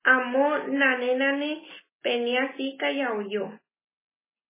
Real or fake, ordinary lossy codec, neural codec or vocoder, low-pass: real; MP3, 16 kbps; none; 3.6 kHz